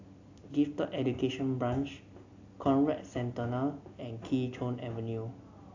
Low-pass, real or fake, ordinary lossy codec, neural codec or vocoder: 7.2 kHz; real; AAC, 48 kbps; none